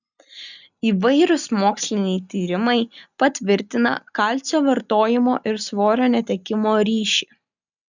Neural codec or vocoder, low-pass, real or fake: none; 7.2 kHz; real